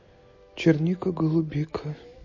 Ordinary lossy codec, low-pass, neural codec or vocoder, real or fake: MP3, 32 kbps; 7.2 kHz; none; real